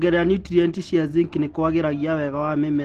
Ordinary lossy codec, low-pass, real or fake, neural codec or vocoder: Opus, 16 kbps; 14.4 kHz; real; none